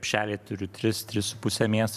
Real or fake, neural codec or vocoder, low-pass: fake; vocoder, 44.1 kHz, 128 mel bands every 512 samples, BigVGAN v2; 14.4 kHz